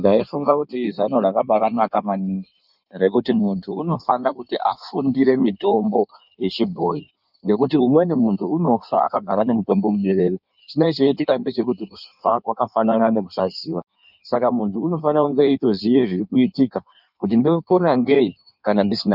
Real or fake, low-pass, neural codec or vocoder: fake; 5.4 kHz; codec, 16 kHz in and 24 kHz out, 1.1 kbps, FireRedTTS-2 codec